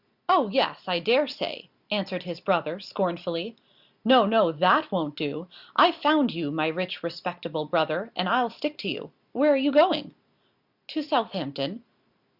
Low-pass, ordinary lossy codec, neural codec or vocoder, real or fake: 5.4 kHz; Opus, 64 kbps; none; real